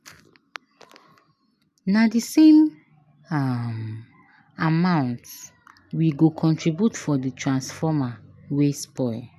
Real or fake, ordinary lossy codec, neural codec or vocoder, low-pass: real; none; none; 14.4 kHz